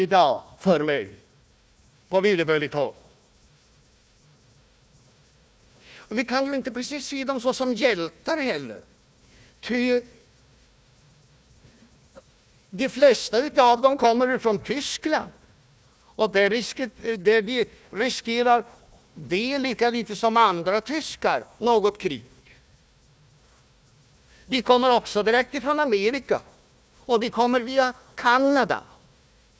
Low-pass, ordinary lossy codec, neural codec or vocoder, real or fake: none; none; codec, 16 kHz, 1 kbps, FunCodec, trained on Chinese and English, 50 frames a second; fake